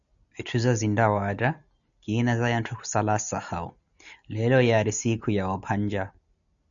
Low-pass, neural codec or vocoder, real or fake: 7.2 kHz; none; real